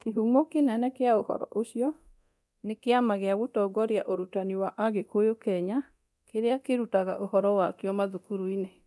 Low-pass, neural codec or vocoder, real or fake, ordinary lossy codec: none; codec, 24 kHz, 0.9 kbps, DualCodec; fake; none